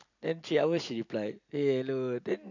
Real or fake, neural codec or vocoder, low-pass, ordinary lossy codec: real; none; 7.2 kHz; none